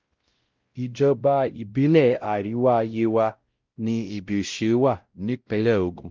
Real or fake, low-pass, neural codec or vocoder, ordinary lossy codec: fake; 7.2 kHz; codec, 16 kHz, 0.5 kbps, X-Codec, WavLM features, trained on Multilingual LibriSpeech; Opus, 32 kbps